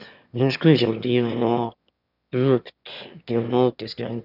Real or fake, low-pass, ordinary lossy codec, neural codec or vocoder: fake; 5.4 kHz; none; autoencoder, 22.05 kHz, a latent of 192 numbers a frame, VITS, trained on one speaker